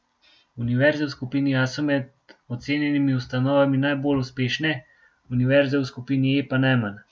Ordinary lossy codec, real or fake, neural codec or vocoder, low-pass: none; real; none; none